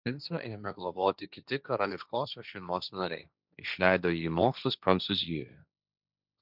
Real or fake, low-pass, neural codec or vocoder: fake; 5.4 kHz; codec, 16 kHz, 1.1 kbps, Voila-Tokenizer